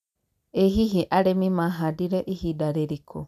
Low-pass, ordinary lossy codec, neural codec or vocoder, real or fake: 14.4 kHz; none; none; real